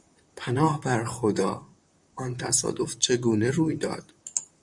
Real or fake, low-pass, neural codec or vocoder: fake; 10.8 kHz; vocoder, 44.1 kHz, 128 mel bands, Pupu-Vocoder